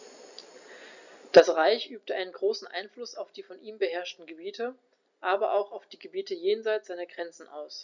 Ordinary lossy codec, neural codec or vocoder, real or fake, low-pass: none; none; real; 7.2 kHz